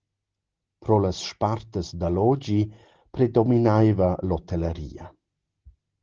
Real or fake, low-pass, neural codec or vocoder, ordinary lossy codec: real; 7.2 kHz; none; Opus, 16 kbps